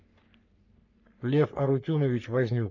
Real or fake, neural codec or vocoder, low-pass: fake; codec, 44.1 kHz, 3.4 kbps, Pupu-Codec; 7.2 kHz